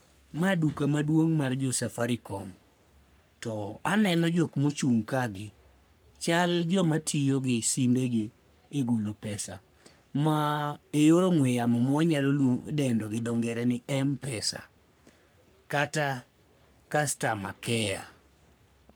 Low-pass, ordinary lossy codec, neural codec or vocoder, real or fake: none; none; codec, 44.1 kHz, 3.4 kbps, Pupu-Codec; fake